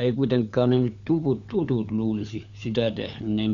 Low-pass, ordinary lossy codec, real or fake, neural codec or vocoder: 7.2 kHz; none; fake; codec, 16 kHz, 4 kbps, FunCodec, trained on LibriTTS, 50 frames a second